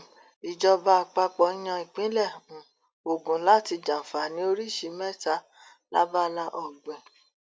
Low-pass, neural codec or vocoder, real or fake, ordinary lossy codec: none; none; real; none